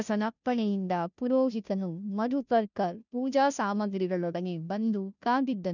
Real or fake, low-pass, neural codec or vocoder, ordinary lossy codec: fake; 7.2 kHz; codec, 16 kHz, 0.5 kbps, FunCodec, trained on Chinese and English, 25 frames a second; none